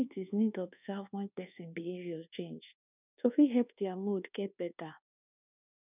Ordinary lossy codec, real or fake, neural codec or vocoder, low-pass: none; fake; codec, 24 kHz, 1.2 kbps, DualCodec; 3.6 kHz